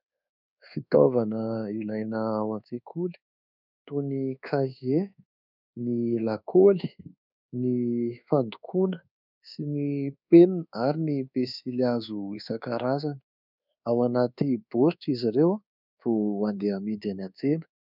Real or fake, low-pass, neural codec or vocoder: fake; 5.4 kHz; codec, 24 kHz, 1.2 kbps, DualCodec